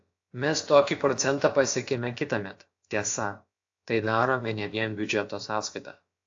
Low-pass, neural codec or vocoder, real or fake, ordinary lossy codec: 7.2 kHz; codec, 16 kHz, about 1 kbps, DyCAST, with the encoder's durations; fake; AAC, 48 kbps